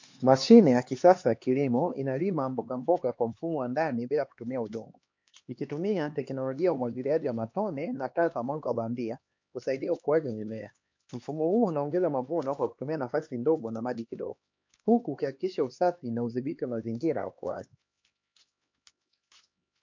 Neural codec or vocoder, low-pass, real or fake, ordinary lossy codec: codec, 16 kHz, 2 kbps, X-Codec, HuBERT features, trained on LibriSpeech; 7.2 kHz; fake; MP3, 48 kbps